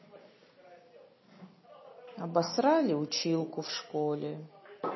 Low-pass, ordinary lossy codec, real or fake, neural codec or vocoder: 7.2 kHz; MP3, 24 kbps; real; none